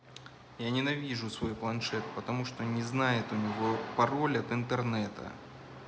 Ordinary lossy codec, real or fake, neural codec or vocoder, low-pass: none; real; none; none